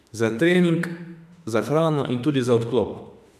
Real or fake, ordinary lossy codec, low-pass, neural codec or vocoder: fake; none; 14.4 kHz; autoencoder, 48 kHz, 32 numbers a frame, DAC-VAE, trained on Japanese speech